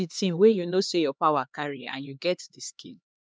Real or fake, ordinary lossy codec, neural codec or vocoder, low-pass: fake; none; codec, 16 kHz, 2 kbps, X-Codec, HuBERT features, trained on LibriSpeech; none